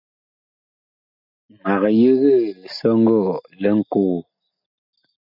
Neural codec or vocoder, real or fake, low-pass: none; real; 5.4 kHz